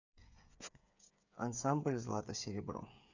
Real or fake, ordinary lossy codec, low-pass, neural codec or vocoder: fake; none; 7.2 kHz; codec, 16 kHz, 8 kbps, FreqCodec, smaller model